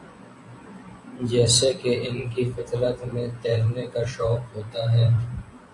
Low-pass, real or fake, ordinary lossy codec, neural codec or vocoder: 10.8 kHz; fake; AAC, 48 kbps; vocoder, 24 kHz, 100 mel bands, Vocos